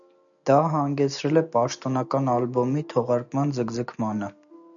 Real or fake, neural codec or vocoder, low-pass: real; none; 7.2 kHz